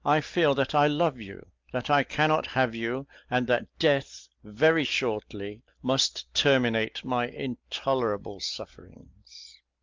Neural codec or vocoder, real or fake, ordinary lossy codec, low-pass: none; real; Opus, 32 kbps; 7.2 kHz